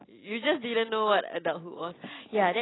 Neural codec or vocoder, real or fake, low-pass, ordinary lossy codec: none; real; 7.2 kHz; AAC, 16 kbps